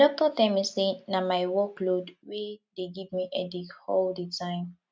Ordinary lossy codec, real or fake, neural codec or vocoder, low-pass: none; real; none; none